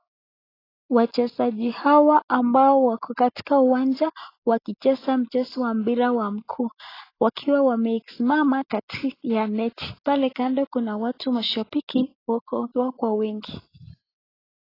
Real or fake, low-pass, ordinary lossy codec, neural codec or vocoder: real; 5.4 kHz; AAC, 24 kbps; none